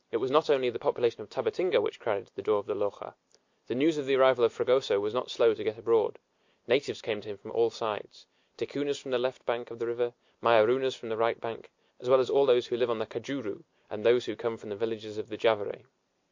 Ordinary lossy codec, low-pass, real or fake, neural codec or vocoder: MP3, 48 kbps; 7.2 kHz; real; none